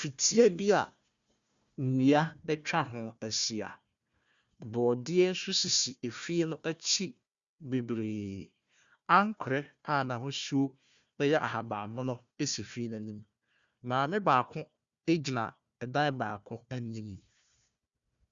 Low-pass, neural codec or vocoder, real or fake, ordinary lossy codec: 7.2 kHz; codec, 16 kHz, 1 kbps, FunCodec, trained on Chinese and English, 50 frames a second; fake; Opus, 64 kbps